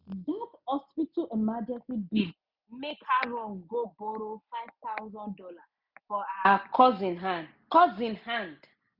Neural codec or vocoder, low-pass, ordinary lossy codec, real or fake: none; 5.4 kHz; none; real